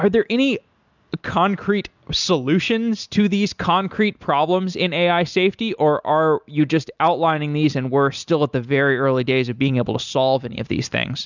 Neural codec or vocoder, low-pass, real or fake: none; 7.2 kHz; real